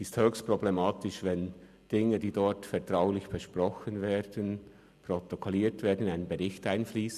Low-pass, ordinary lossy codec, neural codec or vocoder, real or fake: 14.4 kHz; none; none; real